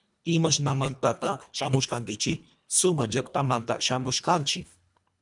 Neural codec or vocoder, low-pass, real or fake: codec, 24 kHz, 1.5 kbps, HILCodec; 10.8 kHz; fake